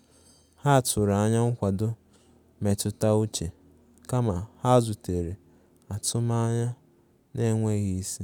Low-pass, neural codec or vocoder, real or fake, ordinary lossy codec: 19.8 kHz; none; real; none